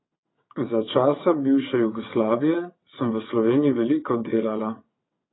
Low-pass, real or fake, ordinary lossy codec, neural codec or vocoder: 7.2 kHz; fake; AAC, 16 kbps; vocoder, 22.05 kHz, 80 mel bands, WaveNeXt